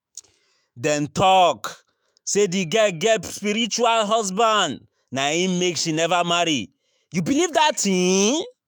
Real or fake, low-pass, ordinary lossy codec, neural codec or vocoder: fake; none; none; autoencoder, 48 kHz, 128 numbers a frame, DAC-VAE, trained on Japanese speech